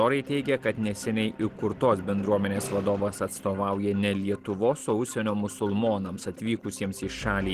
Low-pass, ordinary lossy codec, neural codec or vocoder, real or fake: 14.4 kHz; Opus, 16 kbps; none; real